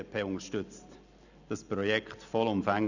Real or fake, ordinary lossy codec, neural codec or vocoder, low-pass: real; none; none; 7.2 kHz